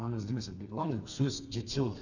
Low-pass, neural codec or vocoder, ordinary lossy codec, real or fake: 7.2 kHz; codec, 32 kHz, 1.9 kbps, SNAC; none; fake